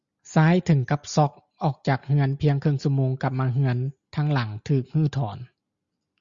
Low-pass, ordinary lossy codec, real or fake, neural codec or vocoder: 7.2 kHz; Opus, 64 kbps; real; none